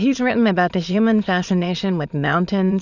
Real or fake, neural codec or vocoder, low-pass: fake; autoencoder, 22.05 kHz, a latent of 192 numbers a frame, VITS, trained on many speakers; 7.2 kHz